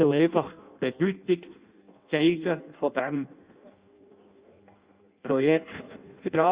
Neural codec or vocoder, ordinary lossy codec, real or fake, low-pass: codec, 16 kHz in and 24 kHz out, 0.6 kbps, FireRedTTS-2 codec; Opus, 64 kbps; fake; 3.6 kHz